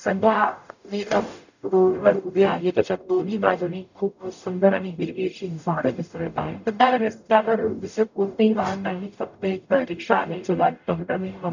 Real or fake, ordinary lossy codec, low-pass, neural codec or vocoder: fake; none; 7.2 kHz; codec, 44.1 kHz, 0.9 kbps, DAC